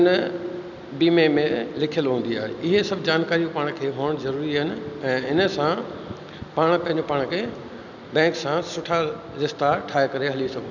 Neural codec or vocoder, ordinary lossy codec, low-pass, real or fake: none; none; 7.2 kHz; real